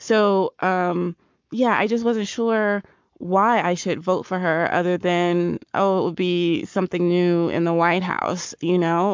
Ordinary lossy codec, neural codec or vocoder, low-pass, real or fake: MP3, 64 kbps; codec, 44.1 kHz, 7.8 kbps, Pupu-Codec; 7.2 kHz; fake